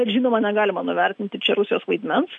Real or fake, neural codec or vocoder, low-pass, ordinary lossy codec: real; none; 10.8 kHz; MP3, 96 kbps